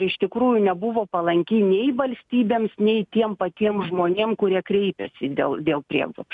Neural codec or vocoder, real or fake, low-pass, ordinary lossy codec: none; real; 10.8 kHz; MP3, 96 kbps